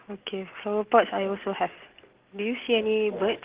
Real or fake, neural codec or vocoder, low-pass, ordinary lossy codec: real; none; 3.6 kHz; Opus, 16 kbps